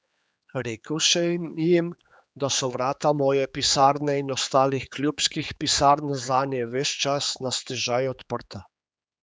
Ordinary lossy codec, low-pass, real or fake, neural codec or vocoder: none; none; fake; codec, 16 kHz, 4 kbps, X-Codec, HuBERT features, trained on general audio